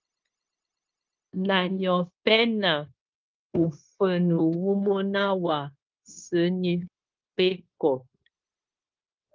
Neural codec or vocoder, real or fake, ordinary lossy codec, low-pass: codec, 16 kHz, 0.9 kbps, LongCat-Audio-Codec; fake; Opus, 24 kbps; 7.2 kHz